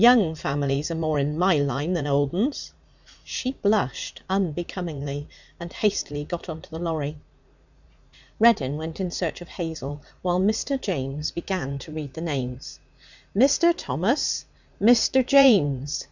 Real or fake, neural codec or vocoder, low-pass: fake; vocoder, 44.1 kHz, 80 mel bands, Vocos; 7.2 kHz